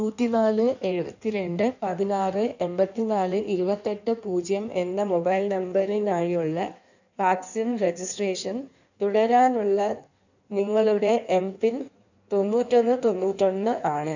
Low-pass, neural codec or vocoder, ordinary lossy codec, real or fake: 7.2 kHz; codec, 16 kHz in and 24 kHz out, 1.1 kbps, FireRedTTS-2 codec; none; fake